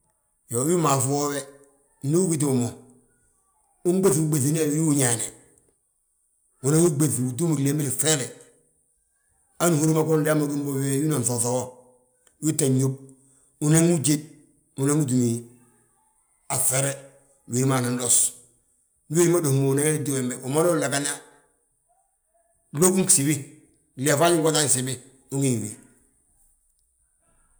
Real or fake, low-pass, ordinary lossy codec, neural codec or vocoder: real; none; none; none